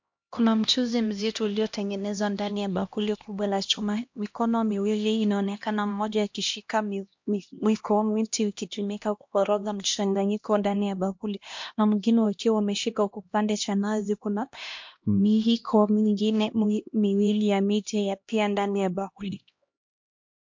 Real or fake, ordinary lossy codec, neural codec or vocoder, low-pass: fake; MP3, 48 kbps; codec, 16 kHz, 1 kbps, X-Codec, HuBERT features, trained on LibriSpeech; 7.2 kHz